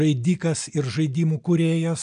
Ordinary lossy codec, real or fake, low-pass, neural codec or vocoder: AAC, 96 kbps; real; 9.9 kHz; none